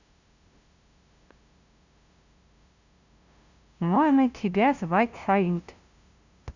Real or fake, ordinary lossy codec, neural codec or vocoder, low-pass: fake; Opus, 64 kbps; codec, 16 kHz, 0.5 kbps, FunCodec, trained on LibriTTS, 25 frames a second; 7.2 kHz